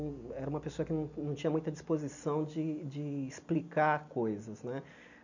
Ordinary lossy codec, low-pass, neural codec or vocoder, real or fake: none; 7.2 kHz; none; real